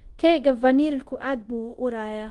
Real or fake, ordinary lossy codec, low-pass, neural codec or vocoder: fake; Opus, 24 kbps; 10.8 kHz; codec, 24 kHz, 0.5 kbps, DualCodec